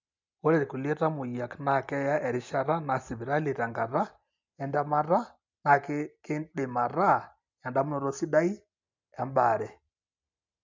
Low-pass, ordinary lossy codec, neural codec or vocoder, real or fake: 7.2 kHz; MP3, 64 kbps; none; real